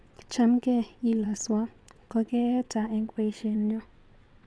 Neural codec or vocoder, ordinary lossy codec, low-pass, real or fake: vocoder, 22.05 kHz, 80 mel bands, WaveNeXt; none; none; fake